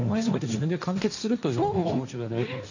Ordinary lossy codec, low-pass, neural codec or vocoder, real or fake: none; 7.2 kHz; codec, 16 kHz, 1.1 kbps, Voila-Tokenizer; fake